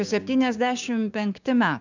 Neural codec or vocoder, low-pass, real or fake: codec, 16 kHz, 6 kbps, DAC; 7.2 kHz; fake